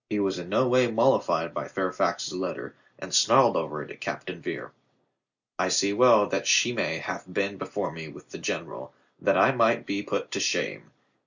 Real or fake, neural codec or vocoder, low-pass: real; none; 7.2 kHz